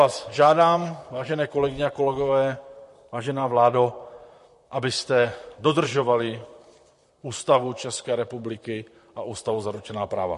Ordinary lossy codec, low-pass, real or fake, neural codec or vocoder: MP3, 48 kbps; 14.4 kHz; fake; vocoder, 44.1 kHz, 128 mel bands, Pupu-Vocoder